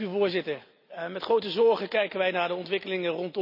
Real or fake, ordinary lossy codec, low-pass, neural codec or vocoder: real; none; 5.4 kHz; none